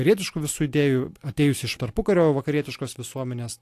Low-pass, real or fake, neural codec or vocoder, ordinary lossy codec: 14.4 kHz; real; none; AAC, 64 kbps